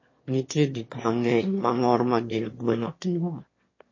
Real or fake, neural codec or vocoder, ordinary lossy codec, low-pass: fake; autoencoder, 22.05 kHz, a latent of 192 numbers a frame, VITS, trained on one speaker; MP3, 32 kbps; 7.2 kHz